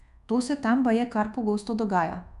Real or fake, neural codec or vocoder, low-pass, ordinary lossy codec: fake; codec, 24 kHz, 1.2 kbps, DualCodec; 10.8 kHz; none